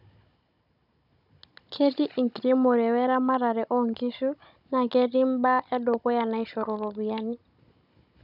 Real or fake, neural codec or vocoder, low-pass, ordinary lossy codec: fake; codec, 16 kHz, 16 kbps, FunCodec, trained on Chinese and English, 50 frames a second; 5.4 kHz; none